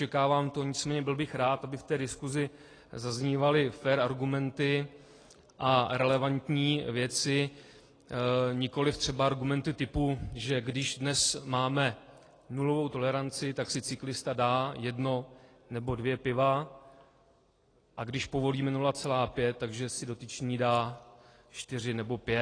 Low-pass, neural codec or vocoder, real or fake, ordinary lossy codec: 9.9 kHz; none; real; AAC, 32 kbps